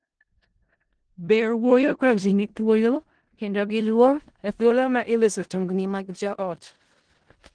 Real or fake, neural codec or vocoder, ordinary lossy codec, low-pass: fake; codec, 16 kHz in and 24 kHz out, 0.4 kbps, LongCat-Audio-Codec, four codebook decoder; Opus, 16 kbps; 9.9 kHz